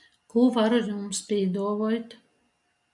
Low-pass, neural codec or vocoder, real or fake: 10.8 kHz; none; real